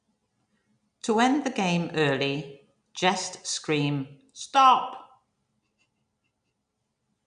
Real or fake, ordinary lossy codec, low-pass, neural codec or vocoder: real; none; 9.9 kHz; none